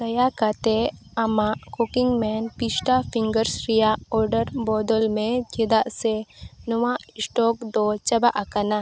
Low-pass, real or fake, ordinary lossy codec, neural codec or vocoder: none; real; none; none